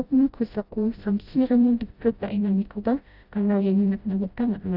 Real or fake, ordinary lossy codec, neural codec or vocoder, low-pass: fake; none; codec, 16 kHz, 0.5 kbps, FreqCodec, smaller model; 5.4 kHz